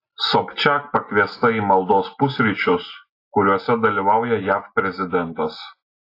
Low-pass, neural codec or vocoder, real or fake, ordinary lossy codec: 5.4 kHz; none; real; AAC, 32 kbps